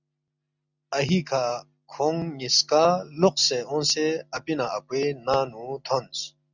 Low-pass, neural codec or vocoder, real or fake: 7.2 kHz; none; real